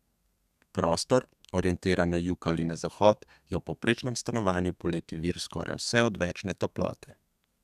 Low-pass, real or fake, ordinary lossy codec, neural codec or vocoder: 14.4 kHz; fake; none; codec, 32 kHz, 1.9 kbps, SNAC